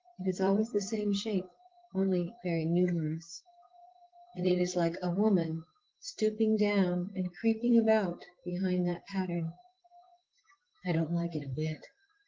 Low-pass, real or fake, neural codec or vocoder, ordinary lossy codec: 7.2 kHz; fake; vocoder, 44.1 kHz, 128 mel bands, Pupu-Vocoder; Opus, 16 kbps